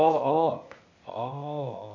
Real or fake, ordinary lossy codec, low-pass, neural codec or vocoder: fake; MP3, 48 kbps; 7.2 kHz; codec, 16 kHz, 0.8 kbps, ZipCodec